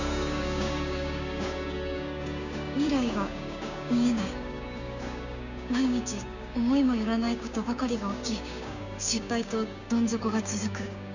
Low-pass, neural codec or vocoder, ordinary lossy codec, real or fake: 7.2 kHz; codec, 16 kHz, 6 kbps, DAC; none; fake